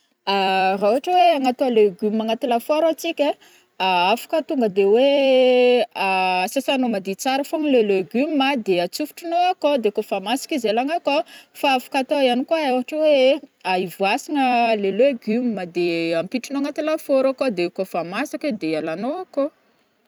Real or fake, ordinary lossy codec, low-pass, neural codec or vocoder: fake; none; none; vocoder, 44.1 kHz, 128 mel bands every 256 samples, BigVGAN v2